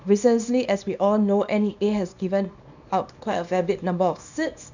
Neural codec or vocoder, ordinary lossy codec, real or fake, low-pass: codec, 24 kHz, 0.9 kbps, WavTokenizer, small release; none; fake; 7.2 kHz